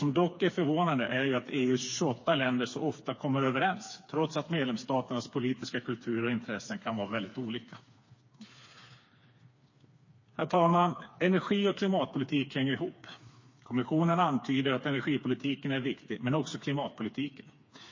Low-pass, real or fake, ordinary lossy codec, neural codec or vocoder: 7.2 kHz; fake; MP3, 32 kbps; codec, 16 kHz, 4 kbps, FreqCodec, smaller model